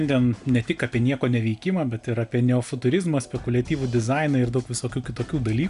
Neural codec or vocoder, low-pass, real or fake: none; 10.8 kHz; real